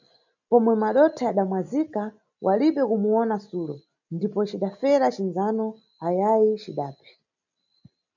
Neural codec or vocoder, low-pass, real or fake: none; 7.2 kHz; real